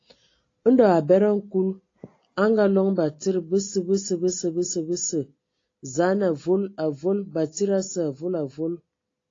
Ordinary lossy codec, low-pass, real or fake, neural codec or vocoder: AAC, 32 kbps; 7.2 kHz; real; none